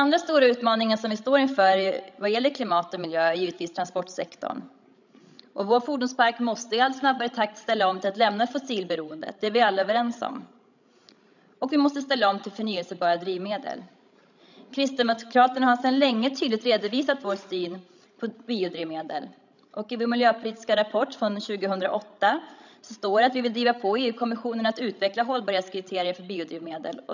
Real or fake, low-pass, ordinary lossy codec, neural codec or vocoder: fake; 7.2 kHz; none; codec, 16 kHz, 16 kbps, FreqCodec, larger model